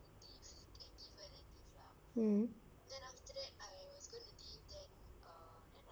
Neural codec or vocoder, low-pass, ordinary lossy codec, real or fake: vocoder, 44.1 kHz, 128 mel bands, Pupu-Vocoder; none; none; fake